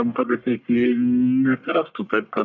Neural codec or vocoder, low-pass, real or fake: codec, 44.1 kHz, 1.7 kbps, Pupu-Codec; 7.2 kHz; fake